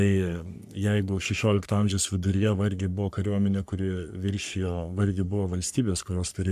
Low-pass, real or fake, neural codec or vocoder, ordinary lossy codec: 14.4 kHz; fake; codec, 44.1 kHz, 3.4 kbps, Pupu-Codec; AAC, 96 kbps